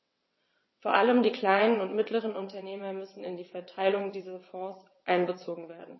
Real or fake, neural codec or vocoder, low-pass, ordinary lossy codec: fake; vocoder, 22.05 kHz, 80 mel bands, WaveNeXt; 7.2 kHz; MP3, 24 kbps